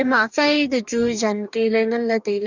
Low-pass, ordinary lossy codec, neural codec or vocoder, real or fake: 7.2 kHz; none; codec, 44.1 kHz, 2.6 kbps, DAC; fake